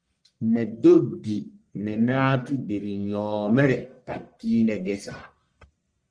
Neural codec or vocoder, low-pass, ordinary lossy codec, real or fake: codec, 44.1 kHz, 1.7 kbps, Pupu-Codec; 9.9 kHz; Opus, 32 kbps; fake